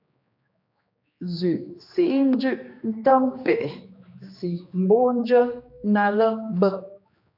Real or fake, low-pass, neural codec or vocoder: fake; 5.4 kHz; codec, 16 kHz, 2 kbps, X-Codec, HuBERT features, trained on general audio